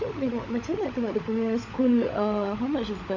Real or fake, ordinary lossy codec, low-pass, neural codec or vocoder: fake; none; 7.2 kHz; codec, 16 kHz, 16 kbps, FunCodec, trained on LibriTTS, 50 frames a second